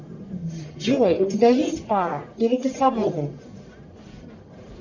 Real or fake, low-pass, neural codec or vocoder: fake; 7.2 kHz; codec, 44.1 kHz, 1.7 kbps, Pupu-Codec